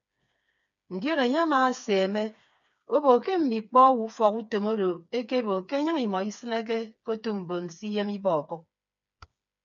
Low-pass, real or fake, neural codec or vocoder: 7.2 kHz; fake; codec, 16 kHz, 4 kbps, FreqCodec, smaller model